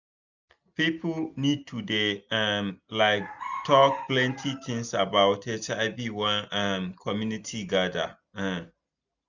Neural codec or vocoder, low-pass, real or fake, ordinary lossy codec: none; 7.2 kHz; real; none